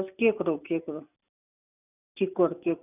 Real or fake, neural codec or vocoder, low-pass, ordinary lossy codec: real; none; 3.6 kHz; none